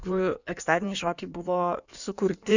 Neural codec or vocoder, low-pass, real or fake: codec, 16 kHz in and 24 kHz out, 1.1 kbps, FireRedTTS-2 codec; 7.2 kHz; fake